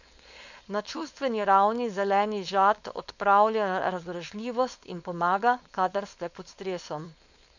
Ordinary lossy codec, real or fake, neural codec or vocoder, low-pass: none; fake; codec, 16 kHz, 4.8 kbps, FACodec; 7.2 kHz